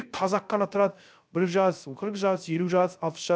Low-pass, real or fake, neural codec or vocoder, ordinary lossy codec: none; fake; codec, 16 kHz, 0.3 kbps, FocalCodec; none